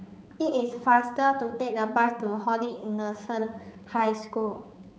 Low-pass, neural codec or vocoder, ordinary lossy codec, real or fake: none; codec, 16 kHz, 4 kbps, X-Codec, HuBERT features, trained on balanced general audio; none; fake